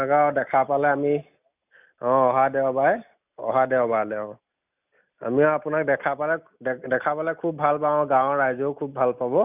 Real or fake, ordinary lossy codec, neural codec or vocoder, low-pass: real; none; none; 3.6 kHz